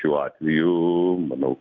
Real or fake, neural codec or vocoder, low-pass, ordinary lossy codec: real; none; 7.2 kHz; MP3, 64 kbps